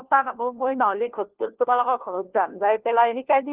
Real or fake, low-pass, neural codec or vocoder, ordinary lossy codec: fake; 3.6 kHz; codec, 16 kHz, 1 kbps, FunCodec, trained on LibriTTS, 50 frames a second; Opus, 16 kbps